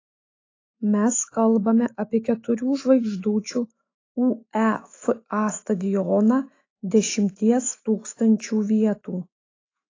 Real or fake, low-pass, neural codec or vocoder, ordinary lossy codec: fake; 7.2 kHz; autoencoder, 48 kHz, 128 numbers a frame, DAC-VAE, trained on Japanese speech; AAC, 32 kbps